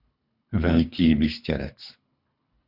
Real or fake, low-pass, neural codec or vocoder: fake; 5.4 kHz; codec, 24 kHz, 3 kbps, HILCodec